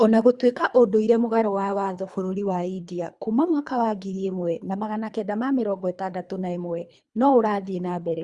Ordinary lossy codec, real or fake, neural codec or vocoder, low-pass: Opus, 64 kbps; fake; codec, 24 kHz, 3 kbps, HILCodec; 10.8 kHz